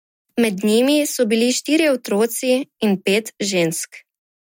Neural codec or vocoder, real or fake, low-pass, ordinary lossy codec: none; real; 19.8 kHz; MP3, 64 kbps